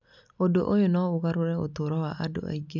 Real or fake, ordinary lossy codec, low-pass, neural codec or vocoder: real; none; 7.2 kHz; none